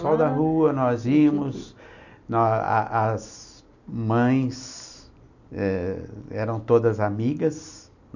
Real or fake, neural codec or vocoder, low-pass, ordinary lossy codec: real; none; 7.2 kHz; none